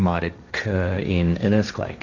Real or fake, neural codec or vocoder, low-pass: fake; codec, 16 kHz, 1.1 kbps, Voila-Tokenizer; 7.2 kHz